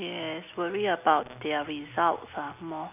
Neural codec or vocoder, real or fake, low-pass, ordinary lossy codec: none; real; 3.6 kHz; none